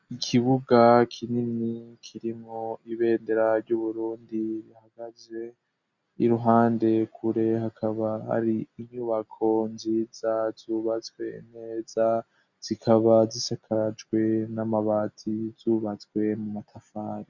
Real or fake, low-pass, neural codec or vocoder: real; 7.2 kHz; none